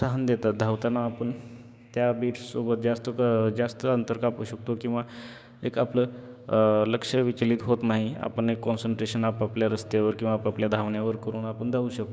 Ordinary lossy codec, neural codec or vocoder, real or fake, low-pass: none; codec, 16 kHz, 6 kbps, DAC; fake; none